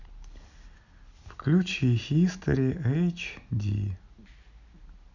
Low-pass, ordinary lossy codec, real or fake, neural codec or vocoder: 7.2 kHz; none; real; none